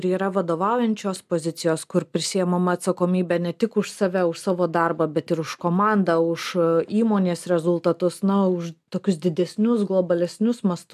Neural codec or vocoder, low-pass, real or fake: none; 14.4 kHz; real